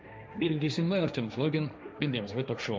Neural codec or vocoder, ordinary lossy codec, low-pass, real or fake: codec, 16 kHz, 1.1 kbps, Voila-Tokenizer; none; none; fake